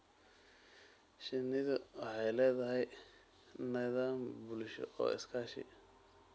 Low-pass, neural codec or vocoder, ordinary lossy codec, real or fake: none; none; none; real